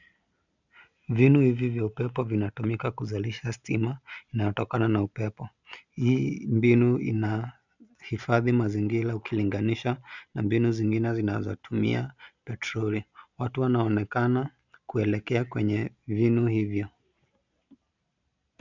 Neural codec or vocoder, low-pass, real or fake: none; 7.2 kHz; real